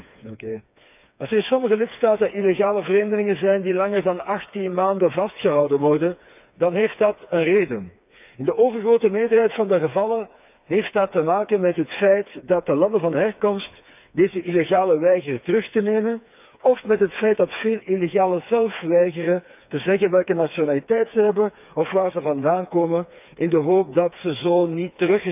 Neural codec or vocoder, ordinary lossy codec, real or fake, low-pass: codec, 16 kHz, 4 kbps, FreqCodec, smaller model; none; fake; 3.6 kHz